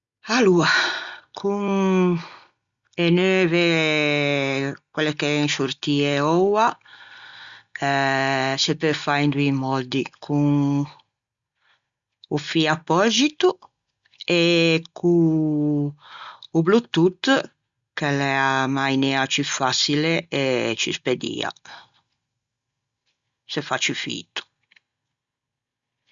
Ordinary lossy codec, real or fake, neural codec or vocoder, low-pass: Opus, 64 kbps; real; none; 7.2 kHz